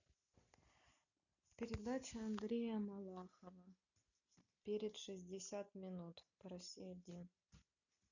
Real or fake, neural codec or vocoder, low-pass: real; none; 7.2 kHz